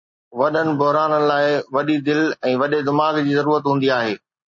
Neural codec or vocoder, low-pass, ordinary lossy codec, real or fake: none; 7.2 kHz; MP3, 32 kbps; real